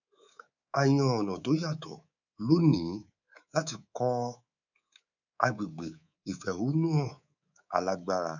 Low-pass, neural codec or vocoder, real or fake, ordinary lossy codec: 7.2 kHz; codec, 24 kHz, 3.1 kbps, DualCodec; fake; none